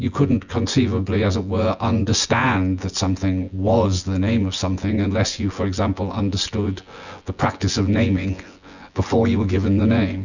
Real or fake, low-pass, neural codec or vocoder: fake; 7.2 kHz; vocoder, 24 kHz, 100 mel bands, Vocos